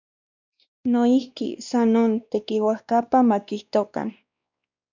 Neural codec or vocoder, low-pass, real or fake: codec, 16 kHz, 2 kbps, X-Codec, WavLM features, trained on Multilingual LibriSpeech; 7.2 kHz; fake